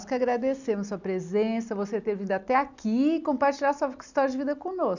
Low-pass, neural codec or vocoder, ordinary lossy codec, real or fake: 7.2 kHz; none; none; real